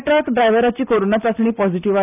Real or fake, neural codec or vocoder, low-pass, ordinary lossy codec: real; none; 3.6 kHz; none